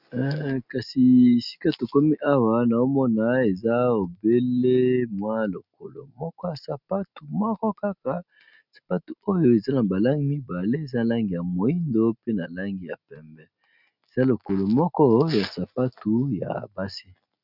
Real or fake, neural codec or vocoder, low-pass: real; none; 5.4 kHz